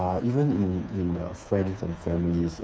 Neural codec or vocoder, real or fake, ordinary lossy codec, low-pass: codec, 16 kHz, 4 kbps, FreqCodec, smaller model; fake; none; none